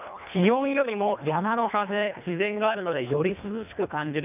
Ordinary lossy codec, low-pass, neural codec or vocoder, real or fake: none; 3.6 kHz; codec, 24 kHz, 1.5 kbps, HILCodec; fake